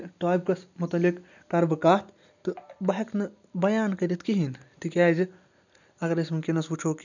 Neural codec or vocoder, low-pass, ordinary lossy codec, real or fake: none; 7.2 kHz; none; real